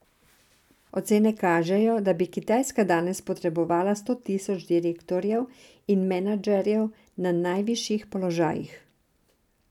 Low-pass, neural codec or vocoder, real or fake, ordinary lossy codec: 19.8 kHz; none; real; none